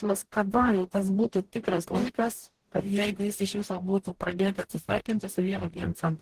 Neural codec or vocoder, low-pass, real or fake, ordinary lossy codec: codec, 44.1 kHz, 0.9 kbps, DAC; 14.4 kHz; fake; Opus, 16 kbps